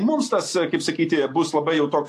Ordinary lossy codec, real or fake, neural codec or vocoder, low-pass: AAC, 64 kbps; real; none; 14.4 kHz